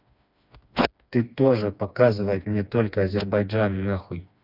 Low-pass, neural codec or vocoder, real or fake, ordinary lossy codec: 5.4 kHz; codec, 16 kHz, 2 kbps, FreqCodec, smaller model; fake; none